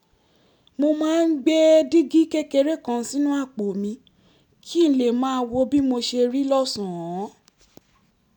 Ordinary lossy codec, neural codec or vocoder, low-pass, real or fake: none; none; 19.8 kHz; real